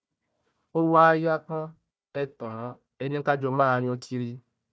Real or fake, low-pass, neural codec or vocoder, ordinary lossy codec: fake; none; codec, 16 kHz, 1 kbps, FunCodec, trained on Chinese and English, 50 frames a second; none